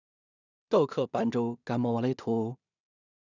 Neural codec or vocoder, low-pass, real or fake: codec, 16 kHz in and 24 kHz out, 0.4 kbps, LongCat-Audio-Codec, two codebook decoder; 7.2 kHz; fake